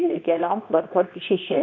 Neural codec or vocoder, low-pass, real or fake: codec, 24 kHz, 0.9 kbps, WavTokenizer, medium speech release version 2; 7.2 kHz; fake